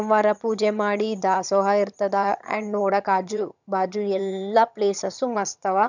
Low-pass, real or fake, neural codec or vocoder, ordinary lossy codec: 7.2 kHz; fake; vocoder, 22.05 kHz, 80 mel bands, HiFi-GAN; none